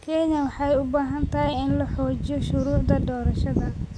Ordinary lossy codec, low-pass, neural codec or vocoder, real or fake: none; none; none; real